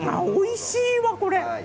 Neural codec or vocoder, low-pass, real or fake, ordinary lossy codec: none; none; real; none